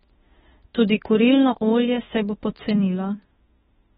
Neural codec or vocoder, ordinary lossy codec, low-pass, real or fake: autoencoder, 48 kHz, 32 numbers a frame, DAC-VAE, trained on Japanese speech; AAC, 16 kbps; 19.8 kHz; fake